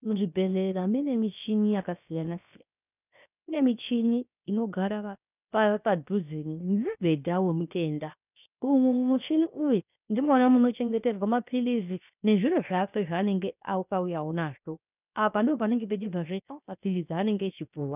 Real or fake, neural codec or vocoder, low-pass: fake; codec, 16 kHz, 0.7 kbps, FocalCodec; 3.6 kHz